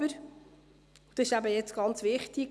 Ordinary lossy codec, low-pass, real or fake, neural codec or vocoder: none; none; real; none